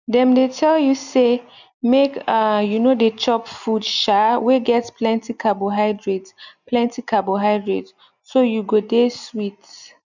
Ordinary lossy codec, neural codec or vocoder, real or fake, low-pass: none; none; real; 7.2 kHz